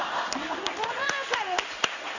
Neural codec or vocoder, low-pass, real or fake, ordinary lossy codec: codec, 16 kHz in and 24 kHz out, 1 kbps, XY-Tokenizer; 7.2 kHz; fake; none